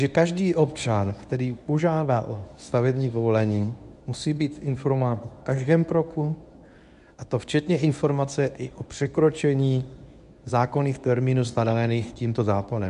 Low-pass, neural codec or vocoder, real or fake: 10.8 kHz; codec, 24 kHz, 0.9 kbps, WavTokenizer, medium speech release version 1; fake